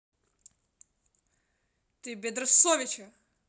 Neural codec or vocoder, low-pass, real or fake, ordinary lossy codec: none; none; real; none